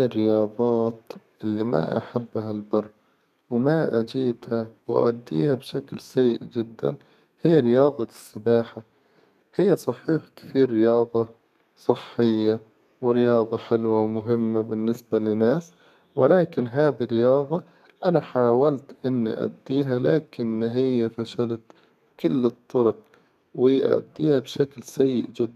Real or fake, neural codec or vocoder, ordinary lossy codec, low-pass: fake; codec, 32 kHz, 1.9 kbps, SNAC; none; 14.4 kHz